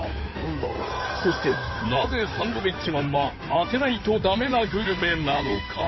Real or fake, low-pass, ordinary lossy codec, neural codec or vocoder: fake; 7.2 kHz; MP3, 24 kbps; codec, 16 kHz in and 24 kHz out, 2.2 kbps, FireRedTTS-2 codec